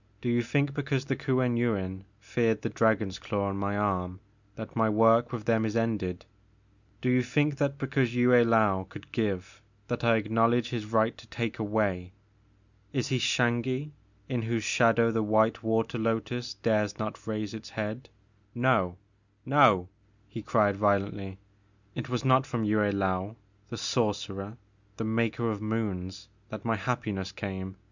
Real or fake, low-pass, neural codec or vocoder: real; 7.2 kHz; none